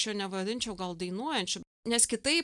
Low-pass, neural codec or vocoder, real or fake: 10.8 kHz; none; real